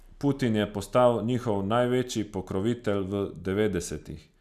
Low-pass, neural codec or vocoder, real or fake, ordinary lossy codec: 14.4 kHz; none; real; none